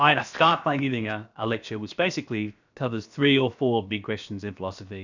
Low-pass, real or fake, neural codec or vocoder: 7.2 kHz; fake; codec, 16 kHz, 0.7 kbps, FocalCodec